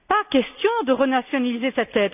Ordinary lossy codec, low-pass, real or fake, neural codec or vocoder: none; 3.6 kHz; real; none